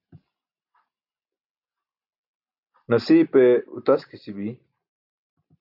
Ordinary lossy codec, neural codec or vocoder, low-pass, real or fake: AAC, 48 kbps; none; 5.4 kHz; real